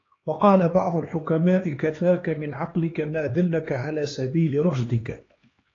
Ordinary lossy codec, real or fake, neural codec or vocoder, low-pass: AAC, 32 kbps; fake; codec, 16 kHz, 2 kbps, X-Codec, HuBERT features, trained on LibriSpeech; 7.2 kHz